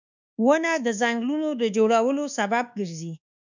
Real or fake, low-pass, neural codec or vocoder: fake; 7.2 kHz; codec, 24 kHz, 1.2 kbps, DualCodec